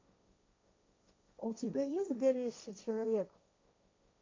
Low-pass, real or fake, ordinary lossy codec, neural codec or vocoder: 7.2 kHz; fake; MP3, 48 kbps; codec, 16 kHz, 1.1 kbps, Voila-Tokenizer